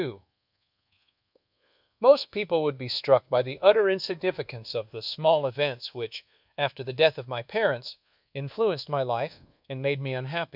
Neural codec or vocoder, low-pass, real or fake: codec, 24 kHz, 1.2 kbps, DualCodec; 5.4 kHz; fake